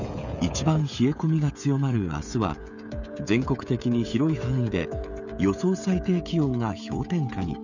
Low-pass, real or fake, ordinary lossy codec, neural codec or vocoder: 7.2 kHz; fake; none; codec, 16 kHz, 16 kbps, FreqCodec, smaller model